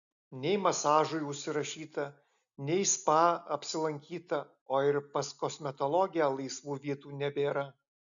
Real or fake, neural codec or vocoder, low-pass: real; none; 7.2 kHz